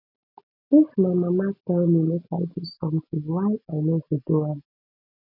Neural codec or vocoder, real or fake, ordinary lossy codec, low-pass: none; real; none; 5.4 kHz